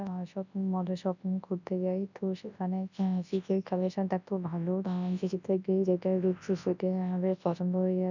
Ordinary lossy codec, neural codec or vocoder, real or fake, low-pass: none; codec, 24 kHz, 0.9 kbps, WavTokenizer, large speech release; fake; 7.2 kHz